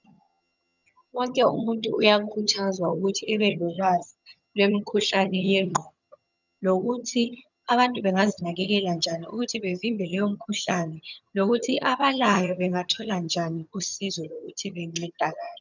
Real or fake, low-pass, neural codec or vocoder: fake; 7.2 kHz; vocoder, 22.05 kHz, 80 mel bands, HiFi-GAN